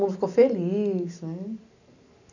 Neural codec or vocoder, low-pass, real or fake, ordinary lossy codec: none; 7.2 kHz; real; none